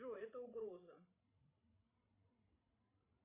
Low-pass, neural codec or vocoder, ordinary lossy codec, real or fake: 3.6 kHz; codec, 16 kHz, 16 kbps, FreqCodec, larger model; AAC, 24 kbps; fake